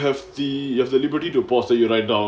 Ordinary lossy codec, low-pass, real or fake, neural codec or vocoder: none; none; real; none